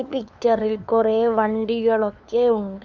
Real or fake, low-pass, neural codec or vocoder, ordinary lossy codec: fake; none; codec, 16 kHz, 2 kbps, FunCodec, trained on LibriTTS, 25 frames a second; none